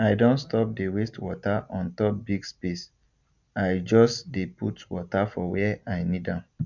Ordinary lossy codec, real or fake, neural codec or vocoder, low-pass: none; real; none; 7.2 kHz